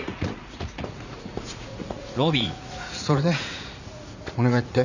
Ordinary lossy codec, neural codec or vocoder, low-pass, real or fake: none; vocoder, 44.1 kHz, 80 mel bands, Vocos; 7.2 kHz; fake